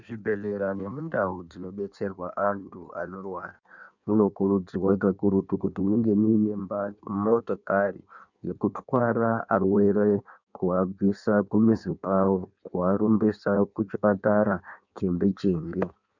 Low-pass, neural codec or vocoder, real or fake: 7.2 kHz; codec, 16 kHz in and 24 kHz out, 1.1 kbps, FireRedTTS-2 codec; fake